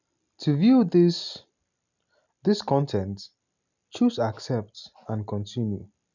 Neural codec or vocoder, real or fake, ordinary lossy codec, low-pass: none; real; none; 7.2 kHz